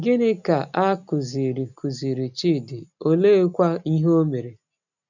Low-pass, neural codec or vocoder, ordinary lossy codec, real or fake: 7.2 kHz; none; none; real